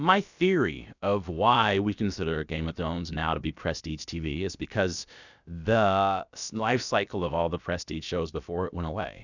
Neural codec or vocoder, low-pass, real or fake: codec, 16 kHz, about 1 kbps, DyCAST, with the encoder's durations; 7.2 kHz; fake